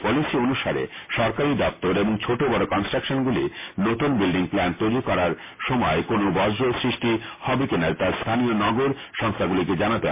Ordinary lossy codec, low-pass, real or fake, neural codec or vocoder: MP3, 24 kbps; 3.6 kHz; real; none